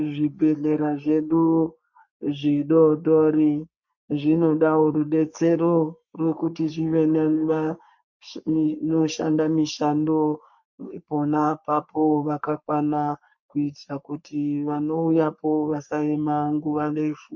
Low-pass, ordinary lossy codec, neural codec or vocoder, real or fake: 7.2 kHz; MP3, 48 kbps; codec, 44.1 kHz, 3.4 kbps, Pupu-Codec; fake